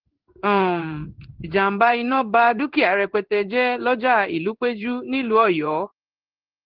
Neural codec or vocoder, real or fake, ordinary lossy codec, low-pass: codec, 16 kHz in and 24 kHz out, 1 kbps, XY-Tokenizer; fake; Opus, 16 kbps; 5.4 kHz